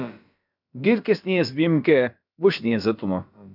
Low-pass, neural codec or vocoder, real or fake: 5.4 kHz; codec, 16 kHz, about 1 kbps, DyCAST, with the encoder's durations; fake